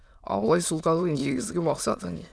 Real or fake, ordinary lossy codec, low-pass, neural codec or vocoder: fake; none; none; autoencoder, 22.05 kHz, a latent of 192 numbers a frame, VITS, trained on many speakers